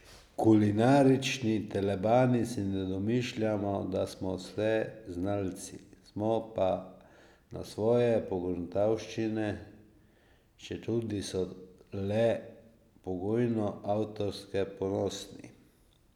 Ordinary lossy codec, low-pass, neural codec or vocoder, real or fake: none; 19.8 kHz; none; real